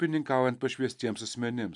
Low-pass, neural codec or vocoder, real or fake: 10.8 kHz; none; real